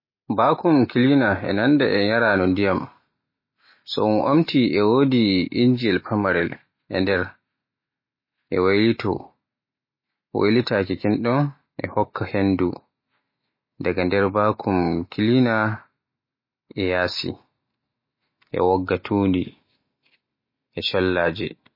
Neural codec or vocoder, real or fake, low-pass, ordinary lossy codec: none; real; 5.4 kHz; MP3, 24 kbps